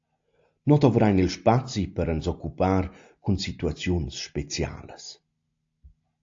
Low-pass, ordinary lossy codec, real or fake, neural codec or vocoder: 7.2 kHz; AAC, 48 kbps; real; none